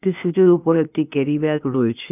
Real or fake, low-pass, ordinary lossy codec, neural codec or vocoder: fake; 3.6 kHz; none; codec, 16 kHz, 0.8 kbps, ZipCodec